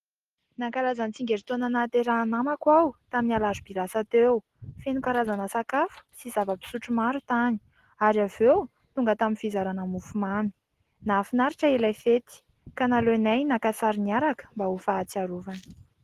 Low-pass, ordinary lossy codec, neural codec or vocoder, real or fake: 14.4 kHz; Opus, 16 kbps; none; real